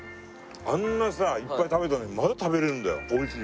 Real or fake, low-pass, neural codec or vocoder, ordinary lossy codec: real; none; none; none